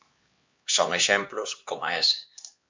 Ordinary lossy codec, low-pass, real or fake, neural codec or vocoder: MP3, 48 kbps; 7.2 kHz; fake; codec, 16 kHz, 2 kbps, X-Codec, HuBERT features, trained on LibriSpeech